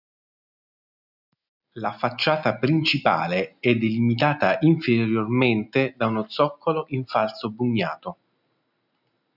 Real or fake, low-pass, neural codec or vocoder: real; 5.4 kHz; none